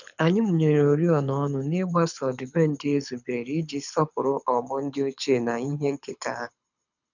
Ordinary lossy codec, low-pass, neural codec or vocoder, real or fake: none; 7.2 kHz; codec, 24 kHz, 6 kbps, HILCodec; fake